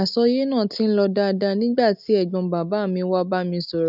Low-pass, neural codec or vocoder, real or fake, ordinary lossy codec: 5.4 kHz; none; real; none